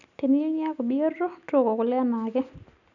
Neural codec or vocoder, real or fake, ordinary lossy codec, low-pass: none; real; none; 7.2 kHz